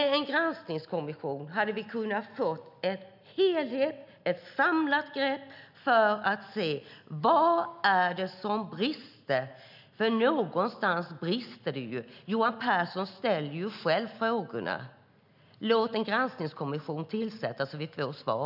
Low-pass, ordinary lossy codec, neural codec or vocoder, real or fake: 5.4 kHz; none; none; real